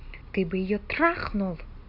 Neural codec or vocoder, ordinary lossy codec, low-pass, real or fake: none; none; 5.4 kHz; real